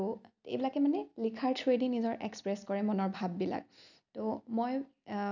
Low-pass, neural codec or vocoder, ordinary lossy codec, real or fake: 7.2 kHz; none; none; real